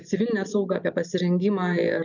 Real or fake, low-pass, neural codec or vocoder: real; 7.2 kHz; none